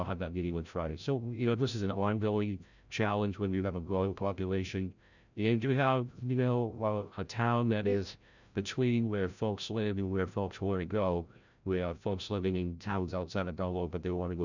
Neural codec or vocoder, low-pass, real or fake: codec, 16 kHz, 0.5 kbps, FreqCodec, larger model; 7.2 kHz; fake